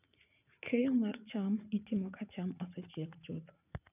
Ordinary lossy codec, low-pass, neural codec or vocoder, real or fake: none; 3.6 kHz; vocoder, 44.1 kHz, 80 mel bands, Vocos; fake